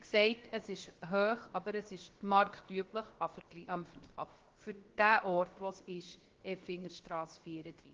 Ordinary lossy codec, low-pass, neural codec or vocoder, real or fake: Opus, 16 kbps; 7.2 kHz; codec, 16 kHz, about 1 kbps, DyCAST, with the encoder's durations; fake